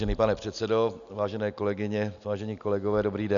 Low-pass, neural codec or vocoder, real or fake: 7.2 kHz; none; real